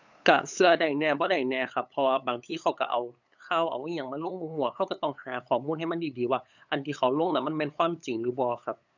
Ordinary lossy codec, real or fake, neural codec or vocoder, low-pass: none; fake; codec, 16 kHz, 8 kbps, FunCodec, trained on LibriTTS, 25 frames a second; 7.2 kHz